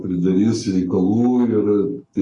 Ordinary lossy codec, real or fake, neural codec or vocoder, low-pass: AAC, 32 kbps; fake; codec, 44.1 kHz, 7.8 kbps, Pupu-Codec; 10.8 kHz